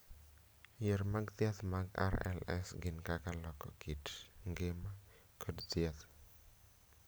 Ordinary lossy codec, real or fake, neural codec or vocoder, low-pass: none; real; none; none